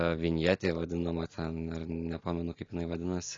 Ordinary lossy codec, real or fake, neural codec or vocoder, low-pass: AAC, 32 kbps; real; none; 7.2 kHz